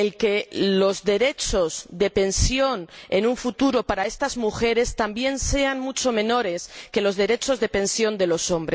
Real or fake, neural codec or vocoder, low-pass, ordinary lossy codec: real; none; none; none